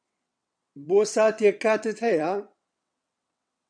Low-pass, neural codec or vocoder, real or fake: 9.9 kHz; vocoder, 22.05 kHz, 80 mel bands, WaveNeXt; fake